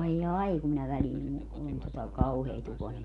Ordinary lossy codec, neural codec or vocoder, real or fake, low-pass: none; none; real; 10.8 kHz